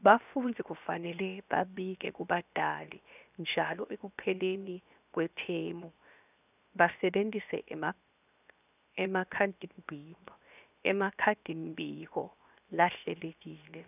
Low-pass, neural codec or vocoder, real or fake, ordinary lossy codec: 3.6 kHz; codec, 16 kHz, 0.7 kbps, FocalCodec; fake; none